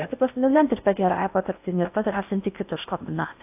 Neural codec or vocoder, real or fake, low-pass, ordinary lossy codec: codec, 16 kHz in and 24 kHz out, 0.6 kbps, FocalCodec, streaming, 4096 codes; fake; 3.6 kHz; AAC, 32 kbps